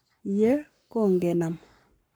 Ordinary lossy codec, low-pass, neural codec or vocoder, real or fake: none; none; codec, 44.1 kHz, 7.8 kbps, Pupu-Codec; fake